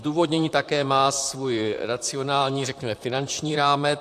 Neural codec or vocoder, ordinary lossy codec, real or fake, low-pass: vocoder, 44.1 kHz, 128 mel bands, Pupu-Vocoder; AAC, 64 kbps; fake; 14.4 kHz